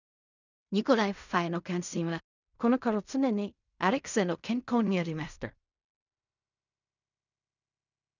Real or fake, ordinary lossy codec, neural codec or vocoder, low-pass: fake; none; codec, 16 kHz in and 24 kHz out, 0.4 kbps, LongCat-Audio-Codec, fine tuned four codebook decoder; 7.2 kHz